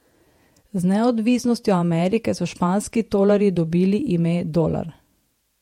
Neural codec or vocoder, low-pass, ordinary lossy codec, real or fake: vocoder, 48 kHz, 128 mel bands, Vocos; 19.8 kHz; MP3, 64 kbps; fake